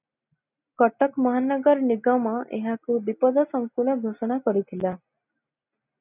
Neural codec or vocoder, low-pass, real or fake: none; 3.6 kHz; real